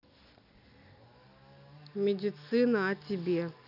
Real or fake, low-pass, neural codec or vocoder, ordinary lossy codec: real; 5.4 kHz; none; none